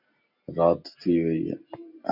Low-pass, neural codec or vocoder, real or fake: 5.4 kHz; none; real